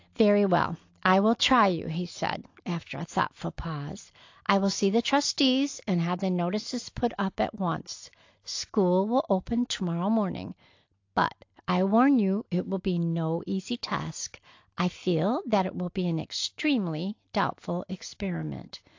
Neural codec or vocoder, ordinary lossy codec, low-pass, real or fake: none; AAC, 48 kbps; 7.2 kHz; real